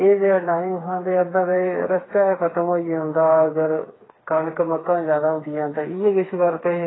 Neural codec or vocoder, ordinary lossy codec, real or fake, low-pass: codec, 16 kHz, 4 kbps, FreqCodec, smaller model; AAC, 16 kbps; fake; 7.2 kHz